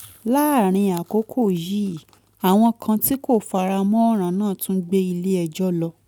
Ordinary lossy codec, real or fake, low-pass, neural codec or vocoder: none; real; 19.8 kHz; none